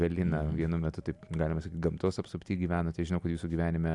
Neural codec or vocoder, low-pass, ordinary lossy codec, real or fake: none; 10.8 kHz; AAC, 64 kbps; real